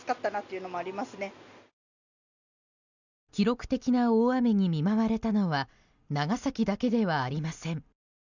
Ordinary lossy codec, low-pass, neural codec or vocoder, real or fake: none; 7.2 kHz; none; real